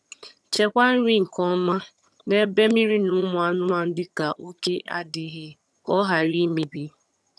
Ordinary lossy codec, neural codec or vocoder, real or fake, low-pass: none; vocoder, 22.05 kHz, 80 mel bands, HiFi-GAN; fake; none